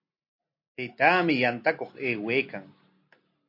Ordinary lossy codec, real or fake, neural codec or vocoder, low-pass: MP3, 32 kbps; real; none; 5.4 kHz